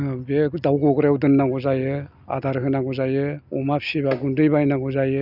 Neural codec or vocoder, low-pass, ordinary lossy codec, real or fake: none; 5.4 kHz; none; real